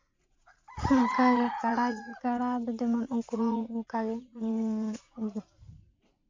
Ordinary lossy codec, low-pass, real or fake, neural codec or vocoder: MP3, 48 kbps; 7.2 kHz; fake; vocoder, 44.1 kHz, 80 mel bands, Vocos